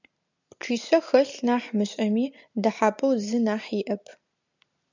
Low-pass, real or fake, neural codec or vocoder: 7.2 kHz; real; none